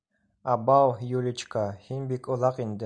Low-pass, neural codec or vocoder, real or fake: 9.9 kHz; none; real